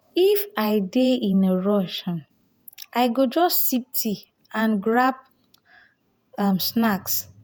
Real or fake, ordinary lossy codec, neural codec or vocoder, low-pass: fake; none; vocoder, 48 kHz, 128 mel bands, Vocos; none